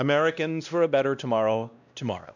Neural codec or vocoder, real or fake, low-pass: codec, 16 kHz, 1 kbps, X-Codec, WavLM features, trained on Multilingual LibriSpeech; fake; 7.2 kHz